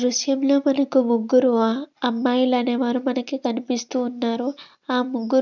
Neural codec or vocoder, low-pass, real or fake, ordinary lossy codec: none; 7.2 kHz; real; none